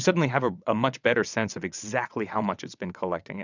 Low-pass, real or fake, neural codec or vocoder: 7.2 kHz; fake; vocoder, 22.05 kHz, 80 mel bands, WaveNeXt